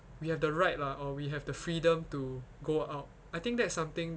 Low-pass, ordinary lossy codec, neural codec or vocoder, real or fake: none; none; none; real